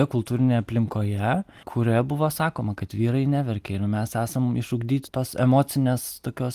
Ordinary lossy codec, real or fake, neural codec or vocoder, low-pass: Opus, 24 kbps; real; none; 14.4 kHz